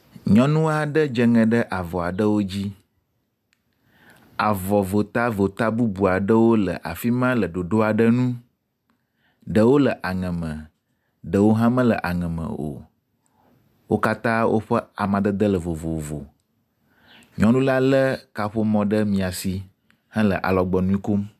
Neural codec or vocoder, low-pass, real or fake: none; 14.4 kHz; real